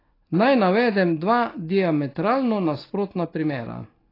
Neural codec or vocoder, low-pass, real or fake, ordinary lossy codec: none; 5.4 kHz; real; AAC, 24 kbps